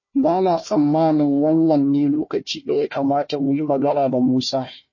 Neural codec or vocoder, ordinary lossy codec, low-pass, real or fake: codec, 16 kHz, 1 kbps, FunCodec, trained on Chinese and English, 50 frames a second; MP3, 32 kbps; 7.2 kHz; fake